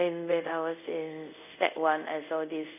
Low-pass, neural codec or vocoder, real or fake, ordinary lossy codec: 3.6 kHz; codec, 24 kHz, 0.5 kbps, DualCodec; fake; none